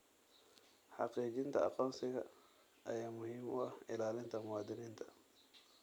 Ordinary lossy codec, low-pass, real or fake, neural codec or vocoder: none; none; real; none